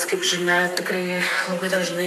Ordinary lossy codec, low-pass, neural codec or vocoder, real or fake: MP3, 96 kbps; 14.4 kHz; codec, 32 kHz, 1.9 kbps, SNAC; fake